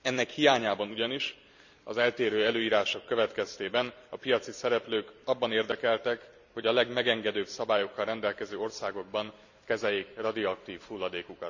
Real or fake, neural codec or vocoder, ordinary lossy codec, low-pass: fake; vocoder, 44.1 kHz, 128 mel bands every 256 samples, BigVGAN v2; none; 7.2 kHz